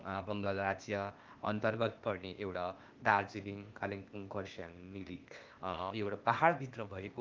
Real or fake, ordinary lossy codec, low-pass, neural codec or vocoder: fake; Opus, 24 kbps; 7.2 kHz; codec, 16 kHz, 0.8 kbps, ZipCodec